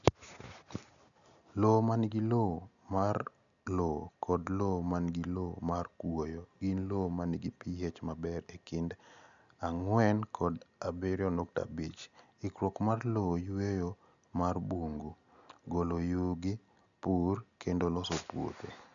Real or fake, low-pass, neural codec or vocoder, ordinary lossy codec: real; 7.2 kHz; none; none